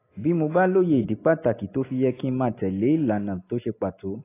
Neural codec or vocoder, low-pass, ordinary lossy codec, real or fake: none; 3.6 kHz; AAC, 24 kbps; real